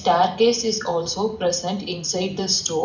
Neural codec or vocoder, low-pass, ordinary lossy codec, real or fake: none; 7.2 kHz; none; real